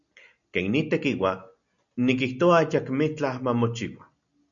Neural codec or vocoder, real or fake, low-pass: none; real; 7.2 kHz